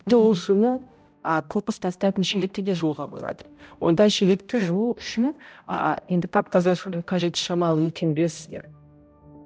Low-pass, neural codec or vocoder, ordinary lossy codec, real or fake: none; codec, 16 kHz, 0.5 kbps, X-Codec, HuBERT features, trained on balanced general audio; none; fake